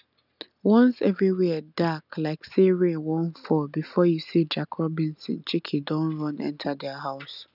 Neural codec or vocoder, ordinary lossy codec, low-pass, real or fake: none; none; 5.4 kHz; real